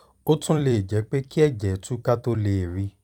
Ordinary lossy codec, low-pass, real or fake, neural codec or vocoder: none; 19.8 kHz; fake; vocoder, 44.1 kHz, 128 mel bands every 256 samples, BigVGAN v2